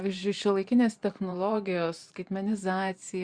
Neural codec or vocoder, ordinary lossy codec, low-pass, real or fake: vocoder, 44.1 kHz, 128 mel bands every 512 samples, BigVGAN v2; Opus, 64 kbps; 9.9 kHz; fake